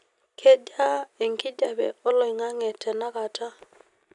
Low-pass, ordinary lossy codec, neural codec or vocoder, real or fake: 10.8 kHz; none; none; real